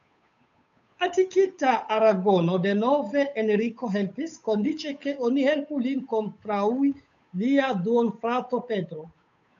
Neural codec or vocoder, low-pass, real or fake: codec, 16 kHz, 8 kbps, FunCodec, trained on Chinese and English, 25 frames a second; 7.2 kHz; fake